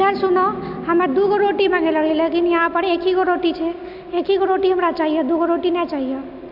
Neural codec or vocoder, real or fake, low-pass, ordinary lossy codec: none; real; 5.4 kHz; MP3, 48 kbps